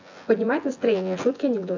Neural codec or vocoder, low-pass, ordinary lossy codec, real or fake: vocoder, 24 kHz, 100 mel bands, Vocos; 7.2 kHz; AAC, 48 kbps; fake